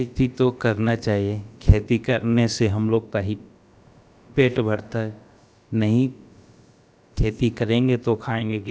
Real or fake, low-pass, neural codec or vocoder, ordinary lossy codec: fake; none; codec, 16 kHz, about 1 kbps, DyCAST, with the encoder's durations; none